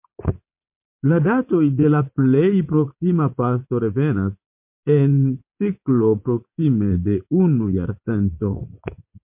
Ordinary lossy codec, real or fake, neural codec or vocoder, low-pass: MP3, 32 kbps; fake; vocoder, 44.1 kHz, 80 mel bands, Vocos; 3.6 kHz